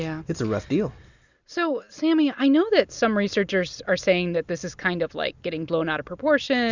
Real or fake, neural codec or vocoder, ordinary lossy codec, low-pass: real; none; Opus, 64 kbps; 7.2 kHz